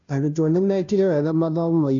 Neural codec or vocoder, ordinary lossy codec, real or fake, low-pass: codec, 16 kHz, 0.5 kbps, FunCodec, trained on Chinese and English, 25 frames a second; MP3, 64 kbps; fake; 7.2 kHz